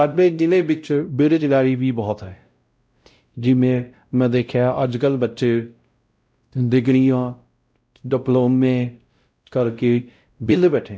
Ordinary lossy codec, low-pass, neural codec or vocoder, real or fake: none; none; codec, 16 kHz, 0.5 kbps, X-Codec, WavLM features, trained on Multilingual LibriSpeech; fake